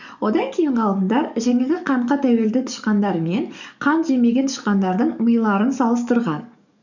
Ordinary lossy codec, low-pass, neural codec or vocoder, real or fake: none; 7.2 kHz; codec, 44.1 kHz, 7.8 kbps, DAC; fake